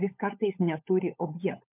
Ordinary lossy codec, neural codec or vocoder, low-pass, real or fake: MP3, 32 kbps; codec, 16 kHz, 16 kbps, FunCodec, trained on LibriTTS, 50 frames a second; 3.6 kHz; fake